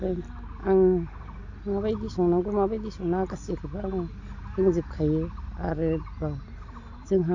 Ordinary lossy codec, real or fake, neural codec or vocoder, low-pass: AAC, 48 kbps; real; none; 7.2 kHz